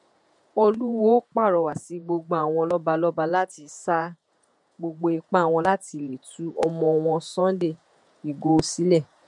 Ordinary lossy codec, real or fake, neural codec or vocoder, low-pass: MP3, 64 kbps; fake; vocoder, 48 kHz, 128 mel bands, Vocos; 10.8 kHz